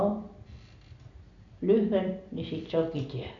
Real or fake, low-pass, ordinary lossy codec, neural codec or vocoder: real; 7.2 kHz; none; none